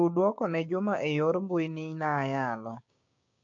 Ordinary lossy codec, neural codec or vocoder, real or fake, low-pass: AAC, 48 kbps; codec, 16 kHz, 6 kbps, DAC; fake; 7.2 kHz